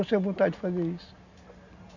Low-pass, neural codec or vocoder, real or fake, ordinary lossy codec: 7.2 kHz; none; real; none